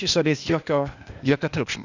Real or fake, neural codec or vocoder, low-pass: fake; codec, 16 kHz, 0.8 kbps, ZipCodec; 7.2 kHz